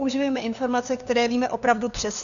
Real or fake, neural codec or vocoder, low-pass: fake; codec, 16 kHz, 2 kbps, FunCodec, trained on LibriTTS, 25 frames a second; 7.2 kHz